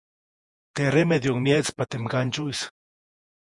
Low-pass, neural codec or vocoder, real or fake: 10.8 kHz; vocoder, 48 kHz, 128 mel bands, Vocos; fake